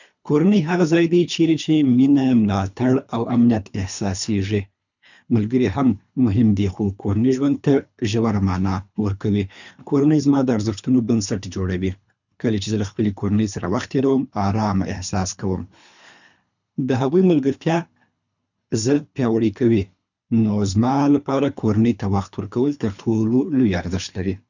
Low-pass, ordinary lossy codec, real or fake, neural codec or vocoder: 7.2 kHz; none; fake; codec, 24 kHz, 3 kbps, HILCodec